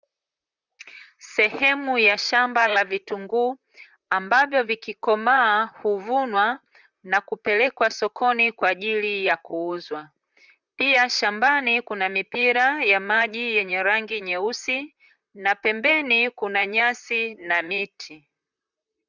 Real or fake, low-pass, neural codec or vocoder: fake; 7.2 kHz; vocoder, 44.1 kHz, 128 mel bands, Pupu-Vocoder